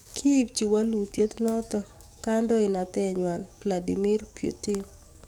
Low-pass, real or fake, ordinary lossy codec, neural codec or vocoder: 19.8 kHz; fake; none; codec, 44.1 kHz, 7.8 kbps, DAC